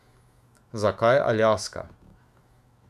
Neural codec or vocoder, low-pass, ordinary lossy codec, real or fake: autoencoder, 48 kHz, 128 numbers a frame, DAC-VAE, trained on Japanese speech; 14.4 kHz; none; fake